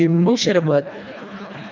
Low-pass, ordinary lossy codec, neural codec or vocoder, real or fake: 7.2 kHz; none; codec, 24 kHz, 1.5 kbps, HILCodec; fake